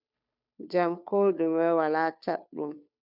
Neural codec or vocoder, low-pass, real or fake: codec, 16 kHz, 2 kbps, FunCodec, trained on Chinese and English, 25 frames a second; 5.4 kHz; fake